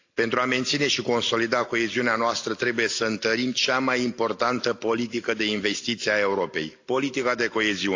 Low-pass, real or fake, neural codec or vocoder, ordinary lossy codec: 7.2 kHz; real; none; AAC, 48 kbps